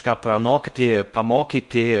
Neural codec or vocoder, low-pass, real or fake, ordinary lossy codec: codec, 16 kHz in and 24 kHz out, 0.6 kbps, FocalCodec, streaming, 4096 codes; 10.8 kHz; fake; MP3, 64 kbps